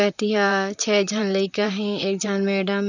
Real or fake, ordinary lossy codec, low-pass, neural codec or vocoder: fake; none; 7.2 kHz; vocoder, 44.1 kHz, 128 mel bands, Pupu-Vocoder